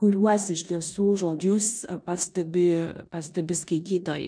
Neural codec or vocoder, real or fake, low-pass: codec, 16 kHz in and 24 kHz out, 0.9 kbps, LongCat-Audio-Codec, four codebook decoder; fake; 9.9 kHz